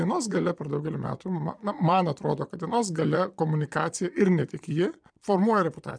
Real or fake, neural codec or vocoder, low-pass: fake; vocoder, 22.05 kHz, 80 mel bands, Vocos; 9.9 kHz